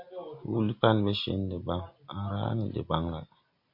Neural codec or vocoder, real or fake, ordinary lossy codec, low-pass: none; real; MP3, 32 kbps; 5.4 kHz